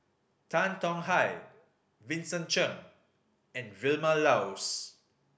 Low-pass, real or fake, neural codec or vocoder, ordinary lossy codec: none; real; none; none